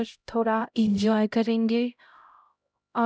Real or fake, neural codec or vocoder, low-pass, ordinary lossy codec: fake; codec, 16 kHz, 0.5 kbps, X-Codec, HuBERT features, trained on LibriSpeech; none; none